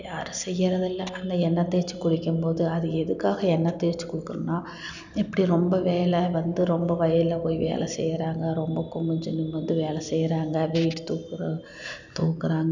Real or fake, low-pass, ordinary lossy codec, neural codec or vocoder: real; 7.2 kHz; none; none